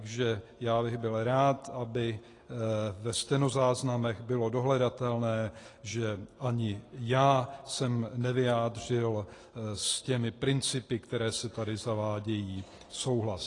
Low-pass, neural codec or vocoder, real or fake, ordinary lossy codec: 10.8 kHz; none; real; AAC, 32 kbps